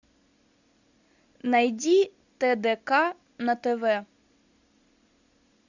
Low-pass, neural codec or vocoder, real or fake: 7.2 kHz; none; real